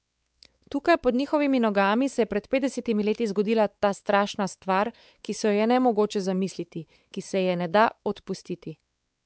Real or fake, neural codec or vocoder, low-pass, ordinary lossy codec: fake; codec, 16 kHz, 4 kbps, X-Codec, WavLM features, trained on Multilingual LibriSpeech; none; none